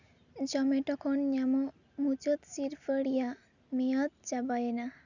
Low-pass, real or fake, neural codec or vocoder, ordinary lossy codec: 7.2 kHz; real; none; none